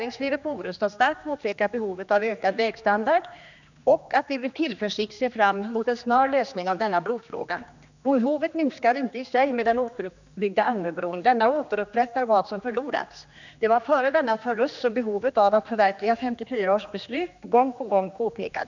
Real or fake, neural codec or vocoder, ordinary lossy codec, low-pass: fake; codec, 16 kHz, 2 kbps, X-Codec, HuBERT features, trained on general audio; none; 7.2 kHz